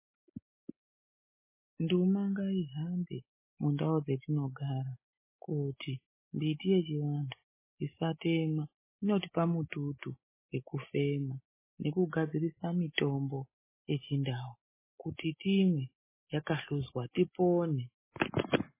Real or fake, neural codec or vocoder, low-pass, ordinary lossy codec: real; none; 3.6 kHz; MP3, 16 kbps